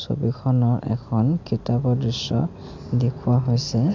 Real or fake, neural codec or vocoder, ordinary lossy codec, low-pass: real; none; MP3, 64 kbps; 7.2 kHz